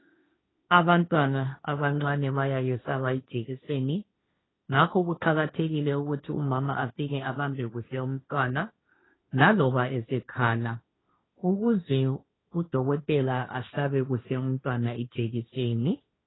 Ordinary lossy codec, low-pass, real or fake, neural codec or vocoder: AAC, 16 kbps; 7.2 kHz; fake; codec, 16 kHz, 1.1 kbps, Voila-Tokenizer